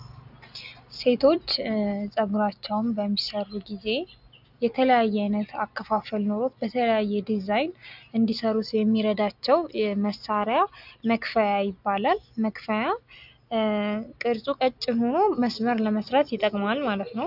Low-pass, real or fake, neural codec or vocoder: 5.4 kHz; real; none